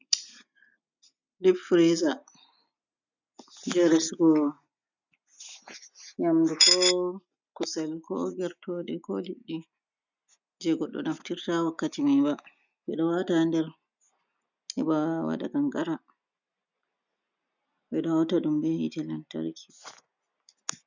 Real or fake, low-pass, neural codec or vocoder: real; 7.2 kHz; none